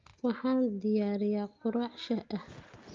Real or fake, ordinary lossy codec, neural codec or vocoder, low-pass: real; Opus, 24 kbps; none; 7.2 kHz